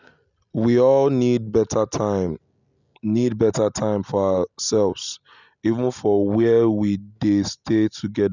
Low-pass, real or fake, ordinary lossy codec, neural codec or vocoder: 7.2 kHz; real; none; none